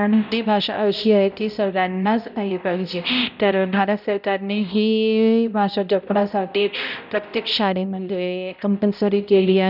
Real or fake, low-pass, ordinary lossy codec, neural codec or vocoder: fake; 5.4 kHz; none; codec, 16 kHz, 0.5 kbps, X-Codec, HuBERT features, trained on balanced general audio